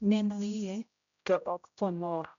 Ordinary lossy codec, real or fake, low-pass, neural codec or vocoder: none; fake; 7.2 kHz; codec, 16 kHz, 0.5 kbps, X-Codec, HuBERT features, trained on general audio